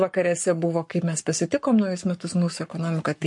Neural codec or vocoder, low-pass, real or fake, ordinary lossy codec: codec, 44.1 kHz, 7.8 kbps, Pupu-Codec; 10.8 kHz; fake; MP3, 48 kbps